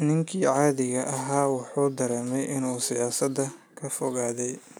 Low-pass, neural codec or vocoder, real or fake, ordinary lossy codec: none; none; real; none